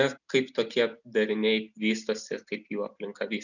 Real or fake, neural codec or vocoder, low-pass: real; none; 7.2 kHz